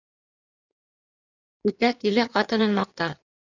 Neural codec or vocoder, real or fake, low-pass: codec, 16 kHz in and 24 kHz out, 2.2 kbps, FireRedTTS-2 codec; fake; 7.2 kHz